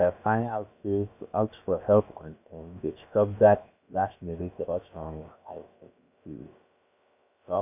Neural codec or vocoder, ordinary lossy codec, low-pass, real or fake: codec, 16 kHz, about 1 kbps, DyCAST, with the encoder's durations; none; 3.6 kHz; fake